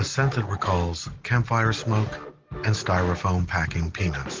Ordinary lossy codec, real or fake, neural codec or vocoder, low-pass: Opus, 16 kbps; real; none; 7.2 kHz